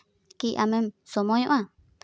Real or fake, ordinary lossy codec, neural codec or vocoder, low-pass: real; none; none; none